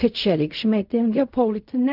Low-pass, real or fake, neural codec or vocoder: 5.4 kHz; fake; codec, 16 kHz in and 24 kHz out, 0.4 kbps, LongCat-Audio-Codec, fine tuned four codebook decoder